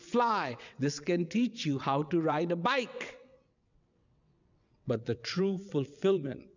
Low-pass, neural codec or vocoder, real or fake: 7.2 kHz; vocoder, 22.05 kHz, 80 mel bands, WaveNeXt; fake